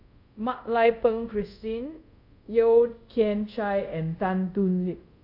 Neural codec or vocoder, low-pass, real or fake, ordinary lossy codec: codec, 24 kHz, 0.5 kbps, DualCodec; 5.4 kHz; fake; none